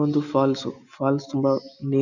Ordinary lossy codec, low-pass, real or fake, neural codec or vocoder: none; 7.2 kHz; real; none